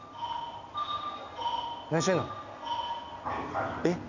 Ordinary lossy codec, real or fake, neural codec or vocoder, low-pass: none; real; none; 7.2 kHz